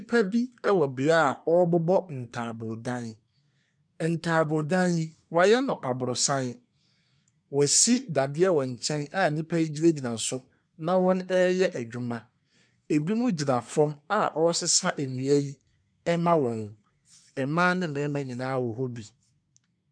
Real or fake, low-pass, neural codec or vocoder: fake; 9.9 kHz; codec, 24 kHz, 1 kbps, SNAC